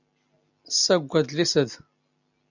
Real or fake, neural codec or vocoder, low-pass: real; none; 7.2 kHz